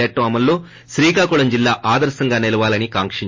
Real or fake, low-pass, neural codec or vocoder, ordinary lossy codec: real; 7.2 kHz; none; MP3, 32 kbps